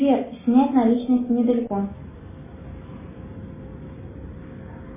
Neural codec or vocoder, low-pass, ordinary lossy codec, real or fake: none; 3.6 kHz; MP3, 16 kbps; real